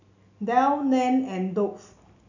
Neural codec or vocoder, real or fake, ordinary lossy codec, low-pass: none; real; none; 7.2 kHz